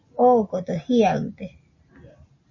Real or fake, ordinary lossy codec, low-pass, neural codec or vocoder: real; MP3, 32 kbps; 7.2 kHz; none